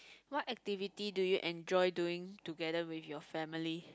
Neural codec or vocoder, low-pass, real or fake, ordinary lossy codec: none; none; real; none